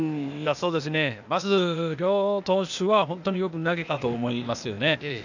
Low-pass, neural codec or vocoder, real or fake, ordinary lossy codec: 7.2 kHz; codec, 16 kHz, 0.8 kbps, ZipCodec; fake; none